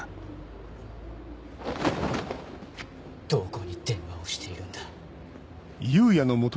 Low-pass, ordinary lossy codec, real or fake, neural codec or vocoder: none; none; real; none